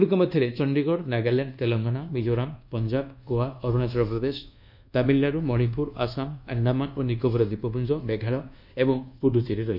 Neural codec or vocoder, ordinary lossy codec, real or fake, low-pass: codec, 24 kHz, 1.2 kbps, DualCodec; none; fake; 5.4 kHz